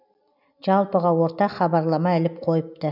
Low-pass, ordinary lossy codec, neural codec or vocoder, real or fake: 5.4 kHz; none; none; real